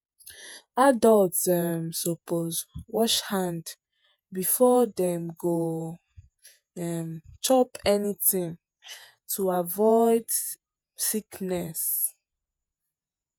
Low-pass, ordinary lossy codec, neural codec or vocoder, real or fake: none; none; vocoder, 48 kHz, 128 mel bands, Vocos; fake